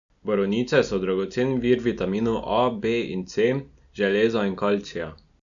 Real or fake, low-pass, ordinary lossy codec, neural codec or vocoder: real; 7.2 kHz; none; none